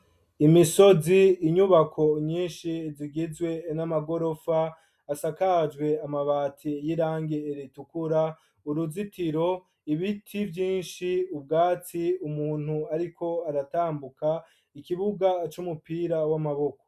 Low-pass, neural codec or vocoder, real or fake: 14.4 kHz; none; real